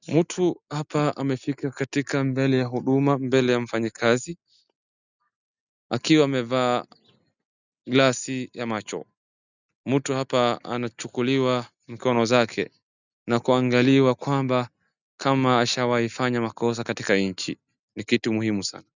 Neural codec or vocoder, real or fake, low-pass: none; real; 7.2 kHz